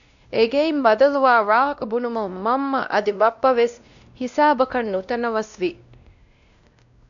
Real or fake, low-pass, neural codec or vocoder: fake; 7.2 kHz; codec, 16 kHz, 1 kbps, X-Codec, WavLM features, trained on Multilingual LibriSpeech